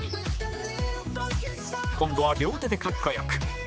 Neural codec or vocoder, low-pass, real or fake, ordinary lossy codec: codec, 16 kHz, 4 kbps, X-Codec, HuBERT features, trained on general audio; none; fake; none